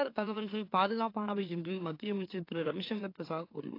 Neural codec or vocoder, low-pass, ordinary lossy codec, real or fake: autoencoder, 44.1 kHz, a latent of 192 numbers a frame, MeloTTS; 5.4 kHz; AAC, 32 kbps; fake